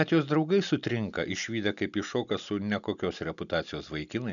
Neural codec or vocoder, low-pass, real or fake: none; 7.2 kHz; real